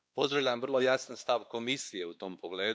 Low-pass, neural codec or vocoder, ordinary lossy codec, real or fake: none; codec, 16 kHz, 2 kbps, X-Codec, WavLM features, trained on Multilingual LibriSpeech; none; fake